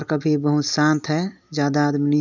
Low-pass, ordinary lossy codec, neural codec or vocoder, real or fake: 7.2 kHz; none; none; real